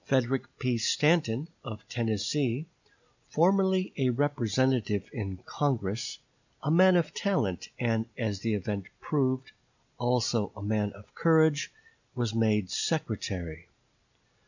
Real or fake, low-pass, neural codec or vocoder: real; 7.2 kHz; none